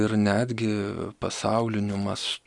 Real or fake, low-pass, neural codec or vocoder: fake; 10.8 kHz; vocoder, 44.1 kHz, 128 mel bands every 256 samples, BigVGAN v2